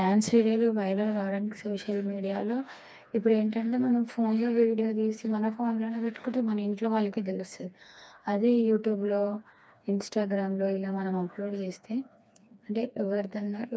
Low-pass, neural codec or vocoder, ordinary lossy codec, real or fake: none; codec, 16 kHz, 2 kbps, FreqCodec, smaller model; none; fake